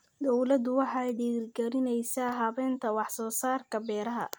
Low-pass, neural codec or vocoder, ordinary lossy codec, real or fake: none; none; none; real